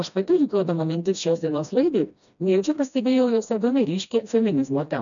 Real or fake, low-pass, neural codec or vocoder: fake; 7.2 kHz; codec, 16 kHz, 1 kbps, FreqCodec, smaller model